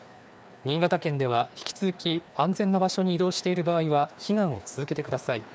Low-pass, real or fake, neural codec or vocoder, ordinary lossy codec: none; fake; codec, 16 kHz, 2 kbps, FreqCodec, larger model; none